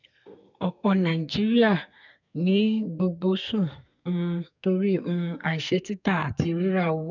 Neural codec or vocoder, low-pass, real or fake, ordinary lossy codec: codec, 32 kHz, 1.9 kbps, SNAC; 7.2 kHz; fake; none